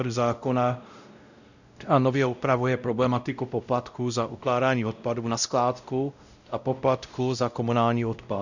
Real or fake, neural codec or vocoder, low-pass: fake; codec, 16 kHz, 0.5 kbps, X-Codec, WavLM features, trained on Multilingual LibriSpeech; 7.2 kHz